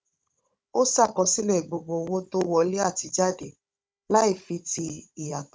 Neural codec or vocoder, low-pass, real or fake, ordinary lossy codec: codec, 16 kHz, 16 kbps, FunCodec, trained on Chinese and English, 50 frames a second; none; fake; none